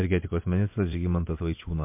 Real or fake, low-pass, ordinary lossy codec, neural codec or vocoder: fake; 3.6 kHz; MP3, 24 kbps; vocoder, 24 kHz, 100 mel bands, Vocos